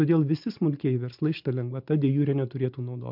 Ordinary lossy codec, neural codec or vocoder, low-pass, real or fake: MP3, 48 kbps; none; 5.4 kHz; real